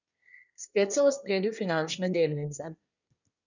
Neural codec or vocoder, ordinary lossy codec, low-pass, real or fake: codec, 24 kHz, 1 kbps, SNAC; none; 7.2 kHz; fake